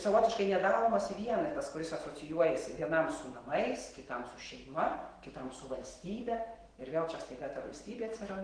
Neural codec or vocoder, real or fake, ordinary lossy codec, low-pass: autoencoder, 48 kHz, 128 numbers a frame, DAC-VAE, trained on Japanese speech; fake; Opus, 16 kbps; 9.9 kHz